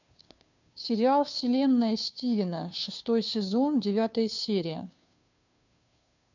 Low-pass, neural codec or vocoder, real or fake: 7.2 kHz; codec, 16 kHz, 2 kbps, FunCodec, trained on Chinese and English, 25 frames a second; fake